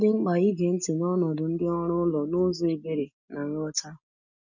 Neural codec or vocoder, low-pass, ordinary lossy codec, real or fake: none; 7.2 kHz; none; real